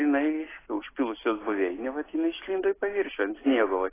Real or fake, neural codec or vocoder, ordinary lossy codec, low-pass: real; none; AAC, 16 kbps; 3.6 kHz